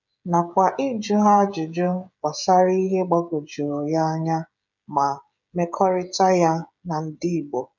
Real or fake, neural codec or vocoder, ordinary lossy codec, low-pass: fake; codec, 16 kHz, 16 kbps, FreqCodec, smaller model; none; 7.2 kHz